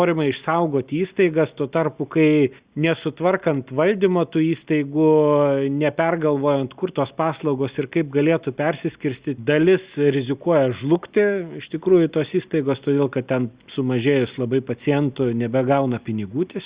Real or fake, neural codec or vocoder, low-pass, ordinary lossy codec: real; none; 3.6 kHz; Opus, 64 kbps